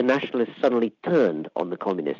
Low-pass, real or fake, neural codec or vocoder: 7.2 kHz; real; none